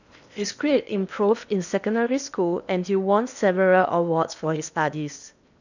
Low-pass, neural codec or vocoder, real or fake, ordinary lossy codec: 7.2 kHz; codec, 16 kHz in and 24 kHz out, 0.8 kbps, FocalCodec, streaming, 65536 codes; fake; none